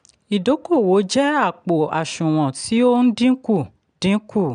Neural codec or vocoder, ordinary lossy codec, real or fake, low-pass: none; none; real; 9.9 kHz